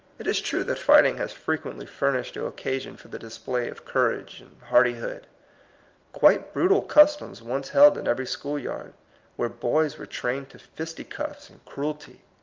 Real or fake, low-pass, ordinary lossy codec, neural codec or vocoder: real; 7.2 kHz; Opus, 24 kbps; none